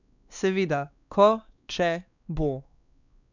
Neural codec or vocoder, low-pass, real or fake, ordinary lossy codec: codec, 16 kHz, 2 kbps, X-Codec, WavLM features, trained on Multilingual LibriSpeech; 7.2 kHz; fake; none